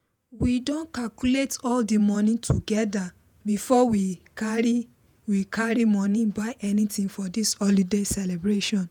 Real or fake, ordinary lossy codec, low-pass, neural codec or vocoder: fake; none; none; vocoder, 48 kHz, 128 mel bands, Vocos